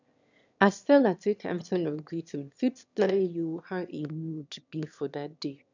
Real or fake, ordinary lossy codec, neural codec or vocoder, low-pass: fake; none; autoencoder, 22.05 kHz, a latent of 192 numbers a frame, VITS, trained on one speaker; 7.2 kHz